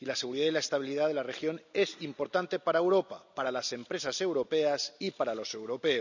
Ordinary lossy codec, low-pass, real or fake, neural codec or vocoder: none; 7.2 kHz; real; none